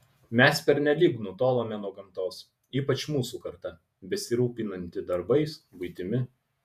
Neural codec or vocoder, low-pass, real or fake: vocoder, 48 kHz, 128 mel bands, Vocos; 14.4 kHz; fake